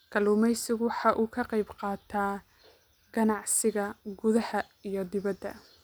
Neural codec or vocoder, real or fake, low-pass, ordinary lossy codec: none; real; none; none